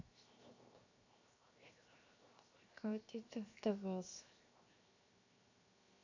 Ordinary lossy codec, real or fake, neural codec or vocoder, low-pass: AAC, 48 kbps; fake; codec, 16 kHz, 0.7 kbps, FocalCodec; 7.2 kHz